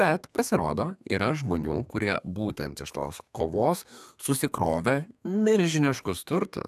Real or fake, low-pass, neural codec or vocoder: fake; 14.4 kHz; codec, 32 kHz, 1.9 kbps, SNAC